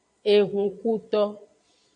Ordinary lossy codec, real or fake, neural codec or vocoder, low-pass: AAC, 48 kbps; fake; vocoder, 22.05 kHz, 80 mel bands, Vocos; 9.9 kHz